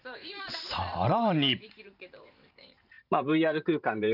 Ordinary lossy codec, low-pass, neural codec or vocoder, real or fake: none; 5.4 kHz; vocoder, 44.1 kHz, 128 mel bands every 512 samples, BigVGAN v2; fake